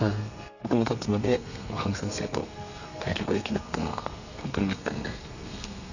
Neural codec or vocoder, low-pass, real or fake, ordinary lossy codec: codec, 32 kHz, 1.9 kbps, SNAC; 7.2 kHz; fake; none